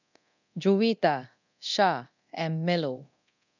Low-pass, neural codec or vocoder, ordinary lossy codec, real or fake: 7.2 kHz; codec, 24 kHz, 0.9 kbps, DualCodec; none; fake